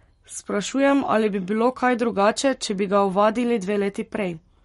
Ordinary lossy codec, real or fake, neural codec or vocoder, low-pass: MP3, 48 kbps; fake; codec, 44.1 kHz, 7.8 kbps, Pupu-Codec; 19.8 kHz